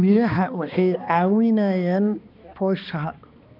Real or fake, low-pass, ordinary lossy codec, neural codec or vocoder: fake; 5.4 kHz; none; codec, 16 kHz, 2 kbps, X-Codec, HuBERT features, trained on balanced general audio